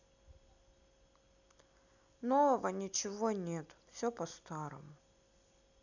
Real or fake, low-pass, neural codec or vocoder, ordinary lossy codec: real; 7.2 kHz; none; none